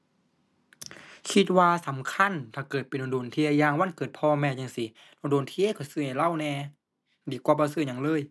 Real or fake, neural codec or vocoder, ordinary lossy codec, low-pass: real; none; none; none